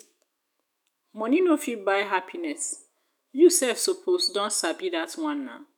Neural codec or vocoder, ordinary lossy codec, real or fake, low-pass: autoencoder, 48 kHz, 128 numbers a frame, DAC-VAE, trained on Japanese speech; none; fake; none